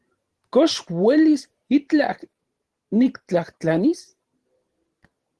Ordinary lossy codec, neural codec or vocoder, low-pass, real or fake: Opus, 16 kbps; vocoder, 44.1 kHz, 128 mel bands every 512 samples, BigVGAN v2; 10.8 kHz; fake